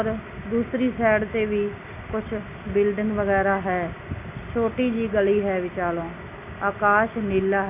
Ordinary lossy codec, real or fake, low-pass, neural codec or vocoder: none; real; 3.6 kHz; none